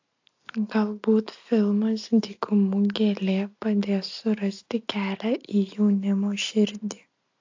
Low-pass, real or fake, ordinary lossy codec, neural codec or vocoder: 7.2 kHz; real; AAC, 48 kbps; none